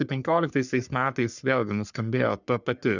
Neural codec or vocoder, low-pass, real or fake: codec, 44.1 kHz, 3.4 kbps, Pupu-Codec; 7.2 kHz; fake